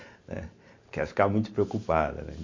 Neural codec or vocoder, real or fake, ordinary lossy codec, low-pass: none; real; MP3, 48 kbps; 7.2 kHz